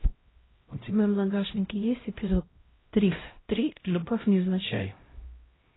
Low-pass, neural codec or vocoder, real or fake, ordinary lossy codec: 7.2 kHz; codec, 16 kHz, 0.5 kbps, X-Codec, HuBERT features, trained on LibriSpeech; fake; AAC, 16 kbps